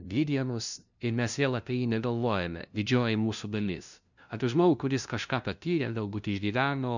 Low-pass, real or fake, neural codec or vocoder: 7.2 kHz; fake; codec, 16 kHz, 0.5 kbps, FunCodec, trained on LibriTTS, 25 frames a second